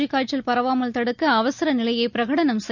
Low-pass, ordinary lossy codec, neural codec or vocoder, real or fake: 7.2 kHz; none; none; real